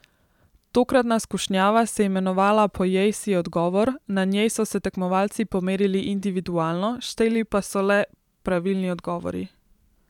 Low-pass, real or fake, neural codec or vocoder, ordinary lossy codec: 19.8 kHz; fake; vocoder, 44.1 kHz, 128 mel bands every 512 samples, BigVGAN v2; none